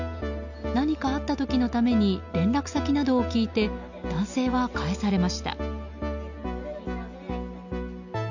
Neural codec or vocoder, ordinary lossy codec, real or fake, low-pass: none; none; real; 7.2 kHz